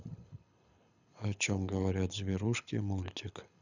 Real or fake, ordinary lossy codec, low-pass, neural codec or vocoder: fake; none; 7.2 kHz; codec, 24 kHz, 6 kbps, HILCodec